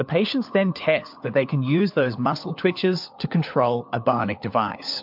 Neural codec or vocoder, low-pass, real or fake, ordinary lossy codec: codec, 16 kHz, 4 kbps, FunCodec, trained on LibriTTS, 50 frames a second; 5.4 kHz; fake; MP3, 48 kbps